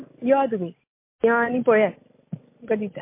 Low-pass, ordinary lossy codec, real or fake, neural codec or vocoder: 3.6 kHz; MP3, 24 kbps; real; none